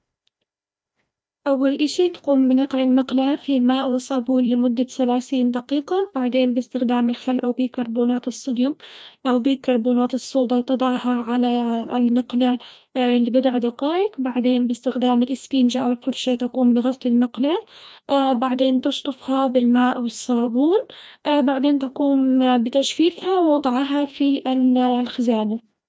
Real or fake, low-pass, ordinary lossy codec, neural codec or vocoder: fake; none; none; codec, 16 kHz, 1 kbps, FreqCodec, larger model